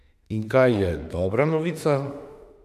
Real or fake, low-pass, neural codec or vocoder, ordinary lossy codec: fake; 14.4 kHz; autoencoder, 48 kHz, 32 numbers a frame, DAC-VAE, trained on Japanese speech; none